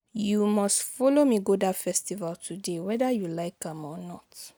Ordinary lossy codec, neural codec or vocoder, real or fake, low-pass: none; none; real; none